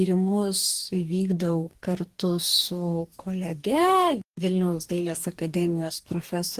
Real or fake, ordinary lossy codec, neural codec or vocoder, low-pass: fake; Opus, 24 kbps; codec, 44.1 kHz, 2.6 kbps, DAC; 14.4 kHz